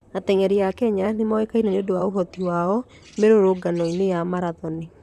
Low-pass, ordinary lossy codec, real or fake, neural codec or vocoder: 14.4 kHz; none; fake; vocoder, 44.1 kHz, 128 mel bands, Pupu-Vocoder